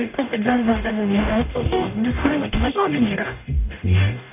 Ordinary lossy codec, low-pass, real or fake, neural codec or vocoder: none; 3.6 kHz; fake; codec, 44.1 kHz, 0.9 kbps, DAC